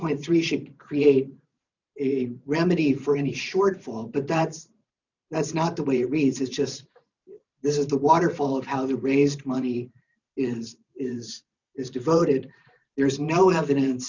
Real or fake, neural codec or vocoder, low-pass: fake; vocoder, 44.1 kHz, 128 mel bands every 512 samples, BigVGAN v2; 7.2 kHz